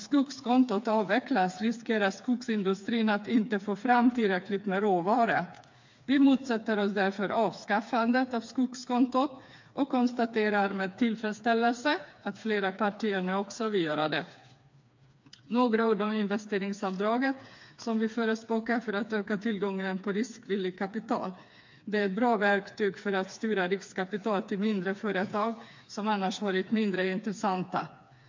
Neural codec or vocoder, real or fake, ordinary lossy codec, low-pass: codec, 16 kHz, 4 kbps, FreqCodec, smaller model; fake; MP3, 48 kbps; 7.2 kHz